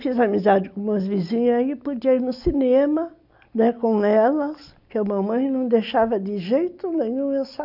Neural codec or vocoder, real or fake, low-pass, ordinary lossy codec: none; real; 5.4 kHz; none